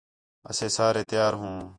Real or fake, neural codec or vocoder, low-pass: fake; vocoder, 48 kHz, 128 mel bands, Vocos; 9.9 kHz